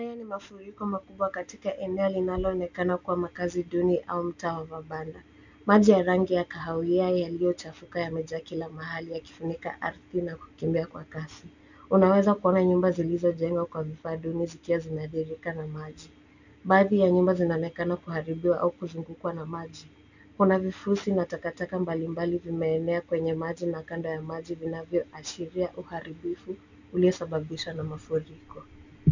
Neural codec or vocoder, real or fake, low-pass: none; real; 7.2 kHz